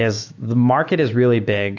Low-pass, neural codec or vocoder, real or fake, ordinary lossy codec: 7.2 kHz; autoencoder, 48 kHz, 128 numbers a frame, DAC-VAE, trained on Japanese speech; fake; AAC, 48 kbps